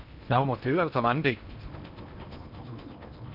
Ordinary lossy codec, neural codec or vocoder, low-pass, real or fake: none; codec, 16 kHz in and 24 kHz out, 0.8 kbps, FocalCodec, streaming, 65536 codes; 5.4 kHz; fake